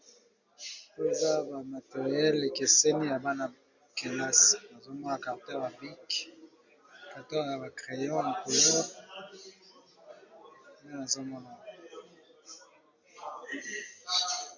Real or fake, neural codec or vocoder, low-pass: real; none; 7.2 kHz